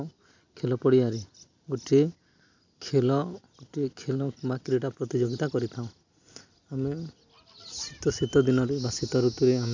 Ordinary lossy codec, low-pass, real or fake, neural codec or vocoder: MP3, 64 kbps; 7.2 kHz; real; none